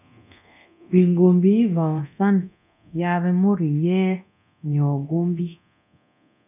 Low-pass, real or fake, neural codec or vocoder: 3.6 kHz; fake; codec, 24 kHz, 0.9 kbps, DualCodec